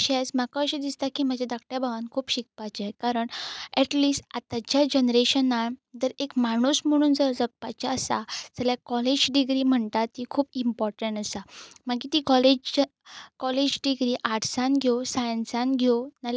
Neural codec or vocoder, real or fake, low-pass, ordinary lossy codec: none; real; none; none